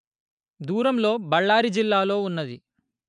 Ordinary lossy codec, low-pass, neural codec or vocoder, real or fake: MP3, 96 kbps; 9.9 kHz; none; real